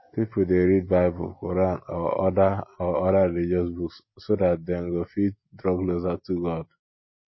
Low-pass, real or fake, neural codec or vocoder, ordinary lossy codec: 7.2 kHz; real; none; MP3, 24 kbps